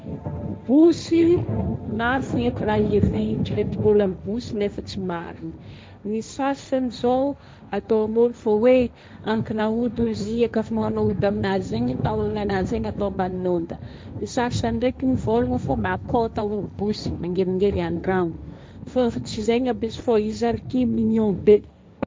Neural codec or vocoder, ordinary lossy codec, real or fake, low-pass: codec, 16 kHz, 1.1 kbps, Voila-Tokenizer; none; fake; none